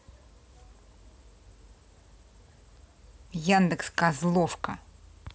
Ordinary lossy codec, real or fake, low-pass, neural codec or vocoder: none; real; none; none